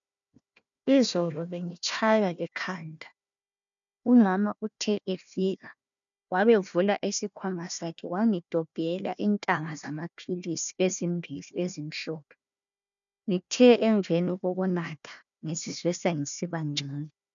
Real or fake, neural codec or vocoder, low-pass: fake; codec, 16 kHz, 1 kbps, FunCodec, trained on Chinese and English, 50 frames a second; 7.2 kHz